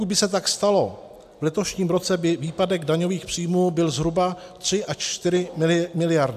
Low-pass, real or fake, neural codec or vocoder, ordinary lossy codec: 14.4 kHz; real; none; AAC, 96 kbps